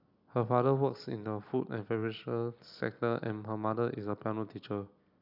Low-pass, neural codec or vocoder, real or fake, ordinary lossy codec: 5.4 kHz; none; real; none